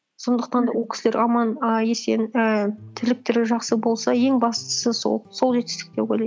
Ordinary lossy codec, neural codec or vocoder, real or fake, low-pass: none; none; real; none